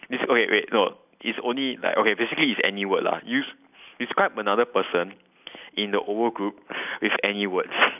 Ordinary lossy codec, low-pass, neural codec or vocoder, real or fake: none; 3.6 kHz; none; real